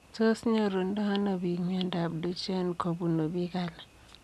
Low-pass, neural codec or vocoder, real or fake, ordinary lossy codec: none; none; real; none